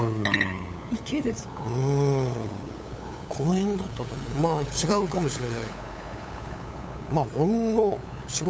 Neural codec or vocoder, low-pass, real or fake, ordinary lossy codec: codec, 16 kHz, 8 kbps, FunCodec, trained on LibriTTS, 25 frames a second; none; fake; none